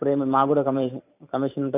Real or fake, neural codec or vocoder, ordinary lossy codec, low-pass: fake; vocoder, 44.1 kHz, 128 mel bands every 512 samples, BigVGAN v2; none; 3.6 kHz